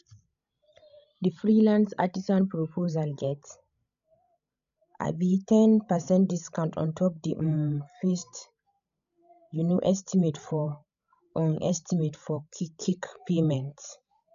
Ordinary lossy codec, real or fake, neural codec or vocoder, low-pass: none; fake; codec, 16 kHz, 16 kbps, FreqCodec, larger model; 7.2 kHz